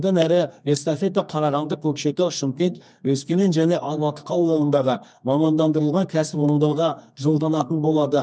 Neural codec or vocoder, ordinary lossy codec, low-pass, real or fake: codec, 24 kHz, 0.9 kbps, WavTokenizer, medium music audio release; none; 9.9 kHz; fake